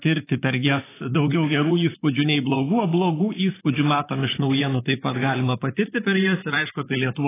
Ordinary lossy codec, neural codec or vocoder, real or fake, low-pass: AAC, 16 kbps; vocoder, 44.1 kHz, 128 mel bands, Pupu-Vocoder; fake; 3.6 kHz